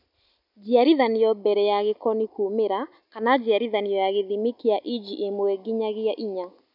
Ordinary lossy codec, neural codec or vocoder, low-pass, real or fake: none; none; 5.4 kHz; real